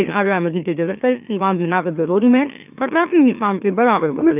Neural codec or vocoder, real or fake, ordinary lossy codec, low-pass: autoencoder, 44.1 kHz, a latent of 192 numbers a frame, MeloTTS; fake; none; 3.6 kHz